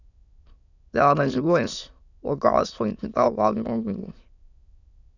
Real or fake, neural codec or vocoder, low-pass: fake; autoencoder, 22.05 kHz, a latent of 192 numbers a frame, VITS, trained on many speakers; 7.2 kHz